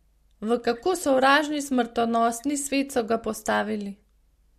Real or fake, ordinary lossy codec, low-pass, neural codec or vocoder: real; MP3, 64 kbps; 14.4 kHz; none